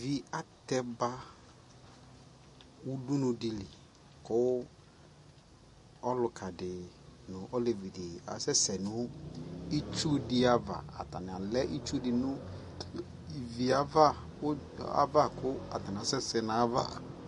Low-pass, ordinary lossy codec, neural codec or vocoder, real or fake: 14.4 kHz; MP3, 48 kbps; none; real